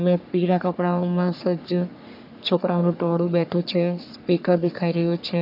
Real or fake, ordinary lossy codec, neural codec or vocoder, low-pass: fake; none; codec, 44.1 kHz, 3.4 kbps, Pupu-Codec; 5.4 kHz